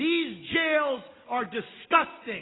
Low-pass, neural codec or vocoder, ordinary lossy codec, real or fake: 7.2 kHz; none; AAC, 16 kbps; real